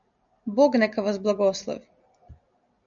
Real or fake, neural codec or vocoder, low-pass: real; none; 7.2 kHz